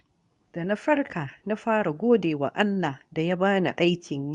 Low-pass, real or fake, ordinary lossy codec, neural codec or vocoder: 10.8 kHz; fake; none; codec, 24 kHz, 0.9 kbps, WavTokenizer, medium speech release version 2